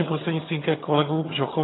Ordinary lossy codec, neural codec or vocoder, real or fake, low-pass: AAC, 16 kbps; vocoder, 22.05 kHz, 80 mel bands, HiFi-GAN; fake; 7.2 kHz